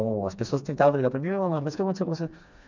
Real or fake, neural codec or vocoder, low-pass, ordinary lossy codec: fake; codec, 16 kHz, 2 kbps, FreqCodec, smaller model; 7.2 kHz; none